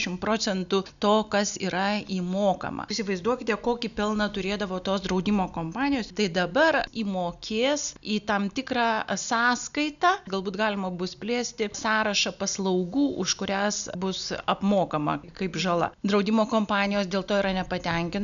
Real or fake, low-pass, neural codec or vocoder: real; 7.2 kHz; none